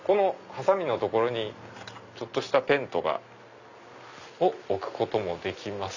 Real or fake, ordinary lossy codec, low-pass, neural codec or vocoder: real; none; 7.2 kHz; none